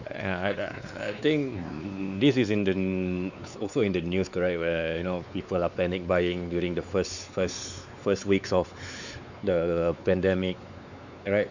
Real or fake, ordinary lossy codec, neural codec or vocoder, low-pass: fake; none; codec, 16 kHz, 4 kbps, X-Codec, WavLM features, trained on Multilingual LibriSpeech; 7.2 kHz